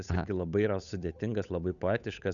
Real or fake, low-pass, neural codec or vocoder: fake; 7.2 kHz; codec, 16 kHz, 8 kbps, FunCodec, trained on Chinese and English, 25 frames a second